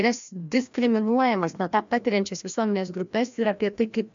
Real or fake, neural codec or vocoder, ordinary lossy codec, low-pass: fake; codec, 16 kHz, 1 kbps, FreqCodec, larger model; MP3, 64 kbps; 7.2 kHz